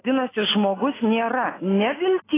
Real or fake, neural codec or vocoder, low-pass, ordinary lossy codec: real; none; 3.6 kHz; AAC, 16 kbps